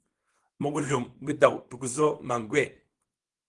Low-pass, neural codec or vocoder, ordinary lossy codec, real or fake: 10.8 kHz; codec, 24 kHz, 0.9 kbps, WavTokenizer, small release; Opus, 24 kbps; fake